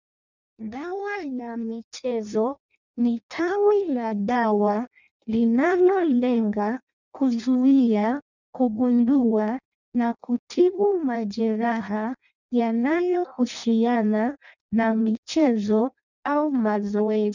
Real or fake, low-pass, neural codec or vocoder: fake; 7.2 kHz; codec, 16 kHz in and 24 kHz out, 0.6 kbps, FireRedTTS-2 codec